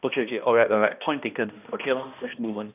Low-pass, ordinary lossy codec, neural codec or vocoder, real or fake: 3.6 kHz; none; codec, 16 kHz, 1 kbps, X-Codec, HuBERT features, trained on balanced general audio; fake